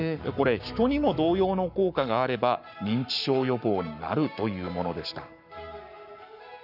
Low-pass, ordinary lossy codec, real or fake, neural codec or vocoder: 5.4 kHz; none; fake; codec, 44.1 kHz, 7.8 kbps, Pupu-Codec